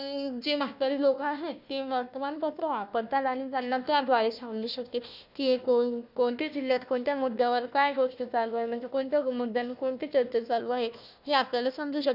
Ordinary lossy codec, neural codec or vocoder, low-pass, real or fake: none; codec, 16 kHz, 1 kbps, FunCodec, trained on Chinese and English, 50 frames a second; 5.4 kHz; fake